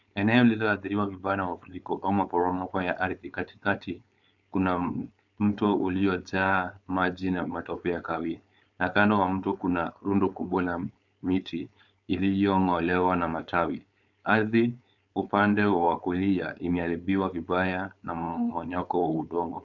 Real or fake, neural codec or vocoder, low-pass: fake; codec, 16 kHz, 4.8 kbps, FACodec; 7.2 kHz